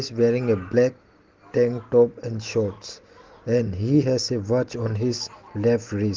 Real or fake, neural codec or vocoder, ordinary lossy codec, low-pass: real; none; Opus, 16 kbps; 7.2 kHz